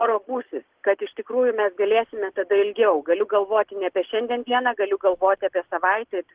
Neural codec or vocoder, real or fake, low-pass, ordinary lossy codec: none; real; 3.6 kHz; Opus, 16 kbps